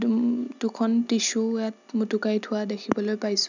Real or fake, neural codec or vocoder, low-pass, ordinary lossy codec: real; none; 7.2 kHz; none